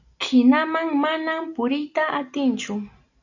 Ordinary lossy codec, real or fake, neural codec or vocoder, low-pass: AAC, 48 kbps; real; none; 7.2 kHz